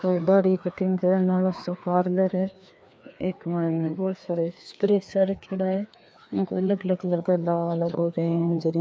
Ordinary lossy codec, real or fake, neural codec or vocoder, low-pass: none; fake; codec, 16 kHz, 2 kbps, FreqCodec, larger model; none